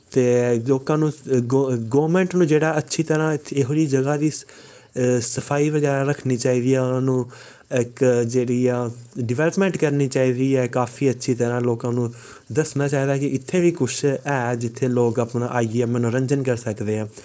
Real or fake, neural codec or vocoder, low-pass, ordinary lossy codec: fake; codec, 16 kHz, 4.8 kbps, FACodec; none; none